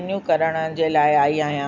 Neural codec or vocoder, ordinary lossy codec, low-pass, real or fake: none; none; 7.2 kHz; real